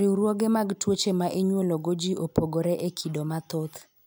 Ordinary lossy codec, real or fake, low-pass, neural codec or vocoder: none; real; none; none